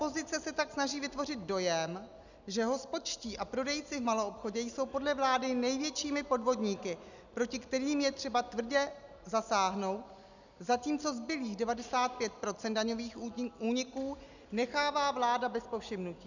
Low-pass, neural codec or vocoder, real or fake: 7.2 kHz; none; real